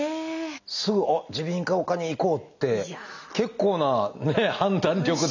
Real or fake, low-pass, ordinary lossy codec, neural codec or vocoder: real; 7.2 kHz; none; none